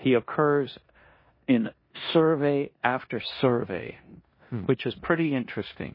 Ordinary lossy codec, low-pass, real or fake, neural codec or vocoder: MP3, 24 kbps; 5.4 kHz; fake; codec, 16 kHz in and 24 kHz out, 0.9 kbps, LongCat-Audio-Codec, four codebook decoder